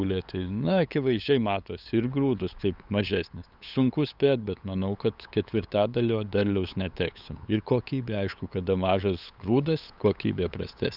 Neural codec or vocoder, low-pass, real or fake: codec, 16 kHz, 8 kbps, FunCodec, trained on LibriTTS, 25 frames a second; 5.4 kHz; fake